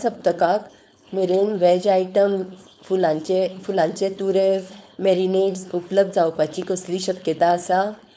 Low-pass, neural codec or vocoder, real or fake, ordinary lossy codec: none; codec, 16 kHz, 4.8 kbps, FACodec; fake; none